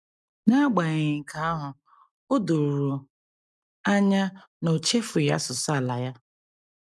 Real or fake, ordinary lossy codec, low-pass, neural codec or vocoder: real; none; none; none